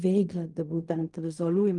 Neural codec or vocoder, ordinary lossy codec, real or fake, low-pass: codec, 16 kHz in and 24 kHz out, 0.4 kbps, LongCat-Audio-Codec, fine tuned four codebook decoder; Opus, 32 kbps; fake; 10.8 kHz